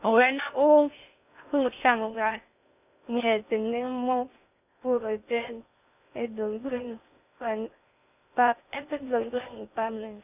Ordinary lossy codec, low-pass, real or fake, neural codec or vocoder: none; 3.6 kHz; fake; codec, 16 kHz in and 24 kHz out, 0.6 kbps, FocalCodec, streaming, 2048 codes